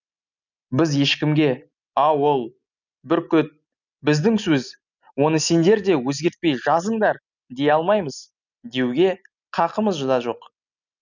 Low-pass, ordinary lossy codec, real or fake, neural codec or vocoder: 7.2 kHz; none; real; none